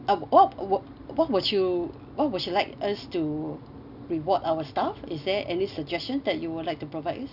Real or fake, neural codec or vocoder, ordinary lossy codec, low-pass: real; none; none; 5.4 kHz